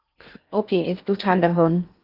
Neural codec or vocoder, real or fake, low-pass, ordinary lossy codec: codec, 16 kHz in and 24 kHz out, 0.8 kbps, FocalCodec, streaming, 65536 codes; fake; 5.4 kHz; Opus, 24 kbps